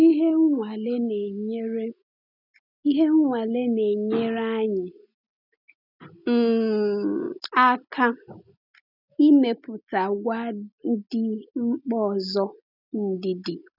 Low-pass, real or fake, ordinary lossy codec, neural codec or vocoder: 5.4 kHz; real; none; none